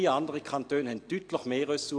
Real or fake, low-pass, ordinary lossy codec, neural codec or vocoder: real; 9.9 kHz; AAC, 48 kbps; none